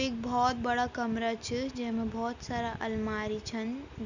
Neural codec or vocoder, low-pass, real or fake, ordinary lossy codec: none; 7.2 kHz; real; none